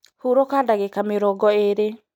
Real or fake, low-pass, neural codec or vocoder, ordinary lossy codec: real; 19.8 kHz; none; none